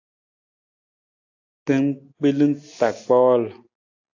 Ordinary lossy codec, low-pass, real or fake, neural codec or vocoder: AAC, 48 kbps; 7.2 kHz; real; none